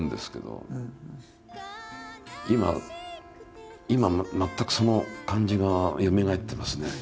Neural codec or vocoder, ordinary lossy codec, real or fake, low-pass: none; none; real; none